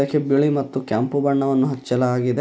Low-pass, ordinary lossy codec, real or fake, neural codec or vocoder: none; none; real; none